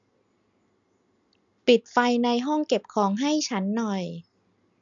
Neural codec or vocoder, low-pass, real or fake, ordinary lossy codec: none; 7.2 kHz; real; none